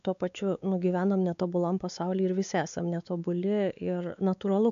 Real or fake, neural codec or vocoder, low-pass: fake; codec, 16 kHz, 4 kbps, X-Codec, WavLM features, trained on Multilingual LibriSpeech; 7.2 kHz